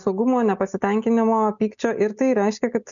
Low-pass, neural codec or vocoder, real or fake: 7.2 kHz; none; real